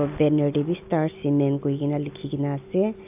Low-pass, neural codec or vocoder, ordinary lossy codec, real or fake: 3.6 kHz; none; AAC, 32 kbps; real